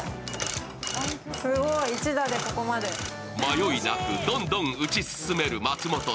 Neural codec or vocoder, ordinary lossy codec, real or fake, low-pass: none; none; real; none